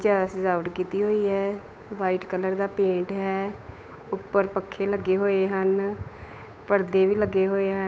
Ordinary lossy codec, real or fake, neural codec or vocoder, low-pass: none; fake; codec, 16 kHz, 8 kbps, FunCodec, trained on Chinese and English, 25 frames a second; none